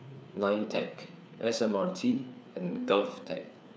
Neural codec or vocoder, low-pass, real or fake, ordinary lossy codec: codec, 16 kHz, 4 kbps, FreqCodec, larger model; none; fake; none